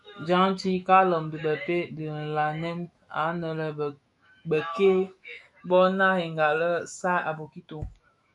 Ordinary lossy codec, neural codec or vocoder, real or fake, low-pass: MP3, 64 kbps; autoencoder, 48 kHz, 128 numbers a frame, DAC-VAE, trained on Japanese speech; fake; 10.8 kHz